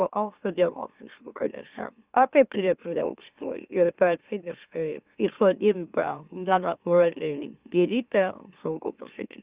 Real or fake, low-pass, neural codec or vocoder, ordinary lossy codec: fake; 3.6 kHz; autoencoder, 44.1 kHz, a latent of 192 numbers a frame, MeloTTS; Opus, 64 kbps